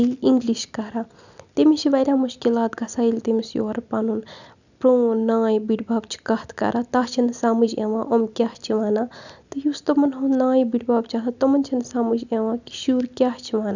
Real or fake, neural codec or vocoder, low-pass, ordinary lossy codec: real; none; 7.2 kHz; none